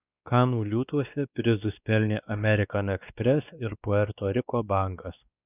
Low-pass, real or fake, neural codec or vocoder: 3.6 kHz; fake; codec, 16 kHz, 2 kbps, X-Codec, WavLM features, trained on Multilingual LibriSpeech